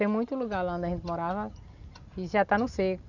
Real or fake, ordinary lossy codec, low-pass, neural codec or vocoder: real; none; 7.2 kHz; none